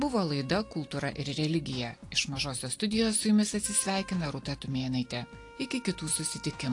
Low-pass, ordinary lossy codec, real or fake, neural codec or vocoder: 10.8 kHz; AAC, 48 kbps; real; none